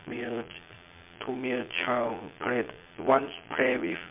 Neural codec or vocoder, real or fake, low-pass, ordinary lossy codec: vocoder, 22.05 kHz, 80 mel bands, Vocos; fake; 3.6 kHz; MP3, 32 kbps